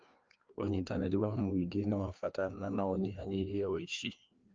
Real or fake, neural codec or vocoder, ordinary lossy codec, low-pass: fake; codec, 16 kHz, 2 kbps, FreqCodec, larger model; Opus, 24 kbps; 7.2 kHz